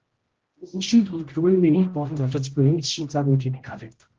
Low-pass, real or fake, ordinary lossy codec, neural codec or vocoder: 7.2 kHz; fake; Opus, 16 kbps; codec, 16 kHz, 0.5 kbps, X-Codec, HuBERT features, trained on general audio